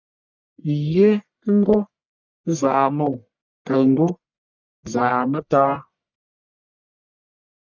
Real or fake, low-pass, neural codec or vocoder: fake; 7.2 kHz; codec, 44.1 kHz, 1.7 kbps, Pupu-Codec